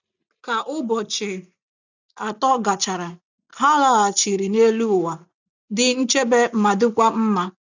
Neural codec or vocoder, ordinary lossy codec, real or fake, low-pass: none; none; real; 7.2 kHz